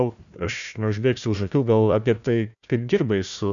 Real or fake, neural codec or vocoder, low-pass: fake; codec, 16 kHz, 1 kbps, FunCodec, trained on Chinese and English, 50 frames a second; 7.2 kHz